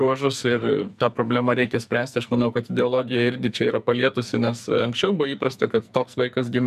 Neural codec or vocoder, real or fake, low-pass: codec, 44.1 kHz, 2.6 kbps, SNAC; fake; 14.4 kHz